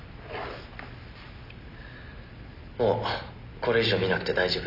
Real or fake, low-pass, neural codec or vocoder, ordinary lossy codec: real; 5.4 kHz; none; none